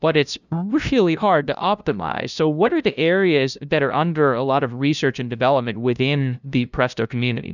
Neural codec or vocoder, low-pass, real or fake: codec, 16 kHz, 0.5 kbps, FunCodec, trained on LibriTTS, 25 frames a second; 7.2 kHz; fake